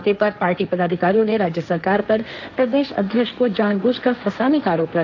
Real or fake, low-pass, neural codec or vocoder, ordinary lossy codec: fake; 7.2 kHz; codec, 16 kHz, 1.1 kbps, Voila-Tokenizer; none